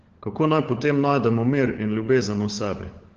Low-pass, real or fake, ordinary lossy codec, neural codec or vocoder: 7.2 kHz; fake; Opus, 16 kbps; codec, 16 kHz, 16 kbps, FunCodec, trained on LibriTTS, 50 frames a second